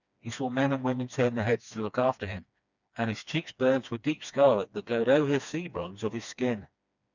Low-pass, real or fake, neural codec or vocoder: 7.2 kHz; fake; codec, 16 kHz, 2 kbps, FreqCodec, smaller model